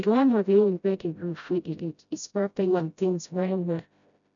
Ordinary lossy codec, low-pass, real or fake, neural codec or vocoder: none; 7.2 kHz; fake; codec, 16 kHz, 0.5 kbps, FreqCodec, smaller model